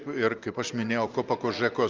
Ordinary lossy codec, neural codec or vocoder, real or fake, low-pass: Opus, 24 kbps; none; real; 7.2 kHz